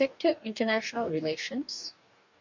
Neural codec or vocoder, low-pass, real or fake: codec, 44.1 kHz, 2.6 kbps, DAC; 7.2 kHz; fake